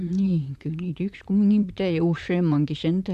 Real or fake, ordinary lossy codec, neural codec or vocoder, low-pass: fake; none; vocoder, 44.1 kHz, 128 mel bands, Pupu-Vocoder; 14.4 kHz